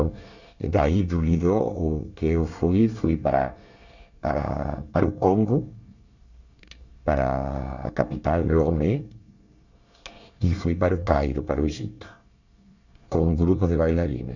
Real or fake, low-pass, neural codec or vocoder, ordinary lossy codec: fake; 7.2 kHz; codec, 24 kHz, 1 kbps, SNAC; none